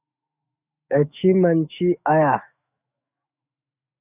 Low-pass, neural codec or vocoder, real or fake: 3.6 kHz; autoencoder, 48 kHz, 128 numbers a frame, DAC-VAE, trained on Japanese speech; fake